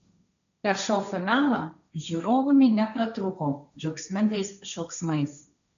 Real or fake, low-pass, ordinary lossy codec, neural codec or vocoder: fake; 7.2 kHz; AAC, 96 kbps; codec, 16 kHz, 1.1 kbps, Voila-Tokenizer